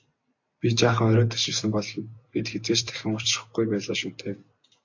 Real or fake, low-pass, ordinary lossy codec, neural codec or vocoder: real; 7.2 kHz; AAC, 48 kbps; none